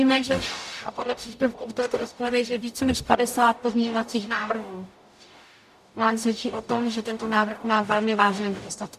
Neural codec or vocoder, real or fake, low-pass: codec, 44.1 kHz, 0.9 kbps, DAC; fake; 14.4 kHz